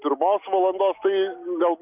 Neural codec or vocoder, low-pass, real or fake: none; 3.6 kHz; real